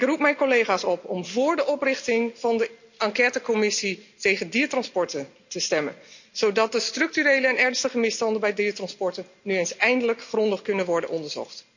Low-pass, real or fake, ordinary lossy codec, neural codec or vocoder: 7.2 kHz; real; none; none